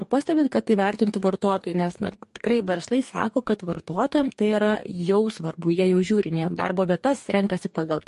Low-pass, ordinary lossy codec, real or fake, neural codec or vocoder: 14.4 kHz; MP3, 48 kbps; fake; codec, 44.1 kHz, 2.6 kbps, DAC